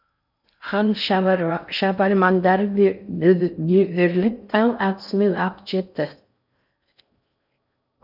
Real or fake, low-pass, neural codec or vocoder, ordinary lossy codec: fake; 5.4 kHz; codec, 16 kHz in and 24 kHz out, 0.6 kbps, FocalCodec, streaming, 4096 codes; none